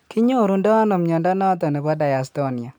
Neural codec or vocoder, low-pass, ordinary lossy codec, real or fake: none; none; none; real